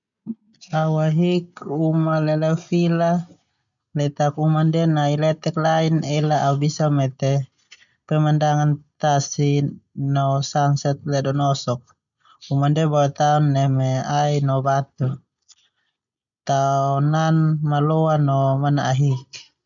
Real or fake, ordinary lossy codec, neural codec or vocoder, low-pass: real; none; none; 7.2 kHz